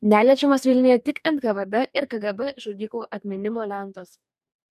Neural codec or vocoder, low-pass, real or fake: codec, 44.1 kHz, 2.6 kbps, DAC; 14.4 kHz; fake